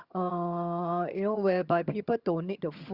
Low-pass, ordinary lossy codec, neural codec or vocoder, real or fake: 5.4 kHz; Opus, 64 kbps; vocoder, 22.05 kHz, 80 mel bands, HiFi-GAN; fake